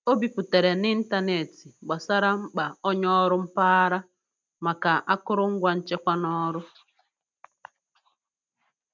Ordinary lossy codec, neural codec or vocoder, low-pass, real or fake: none; none; none; real